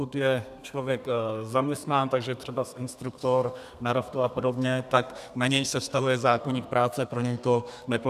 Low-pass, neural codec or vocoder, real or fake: 14.4 kHz; codec, 32 kHz, 1.9 kbps, SNAC; fake